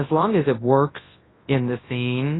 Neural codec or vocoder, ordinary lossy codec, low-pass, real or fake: codec, 24 kHz, 0.9 kbps, WavTokenizer, large speech release; AAC, 16 kbps; 7.2 kHz; fake